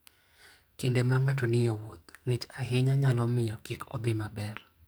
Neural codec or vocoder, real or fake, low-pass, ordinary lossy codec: codec, 44.1 kHz, 2.6 kbps, SNAC; fake; none; none